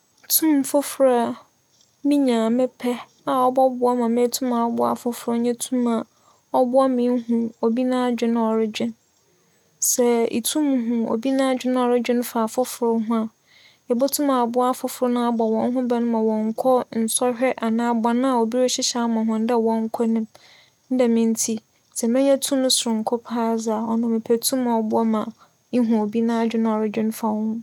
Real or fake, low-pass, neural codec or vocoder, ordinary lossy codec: real; 19.8 kHz; none; none